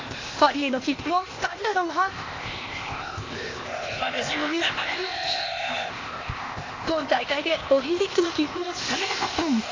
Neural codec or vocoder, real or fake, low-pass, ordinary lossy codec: codec, 16 kHz, 0.8 kbps, ZipCodec; fake; 7.2 kHz; AAC, 32 kbps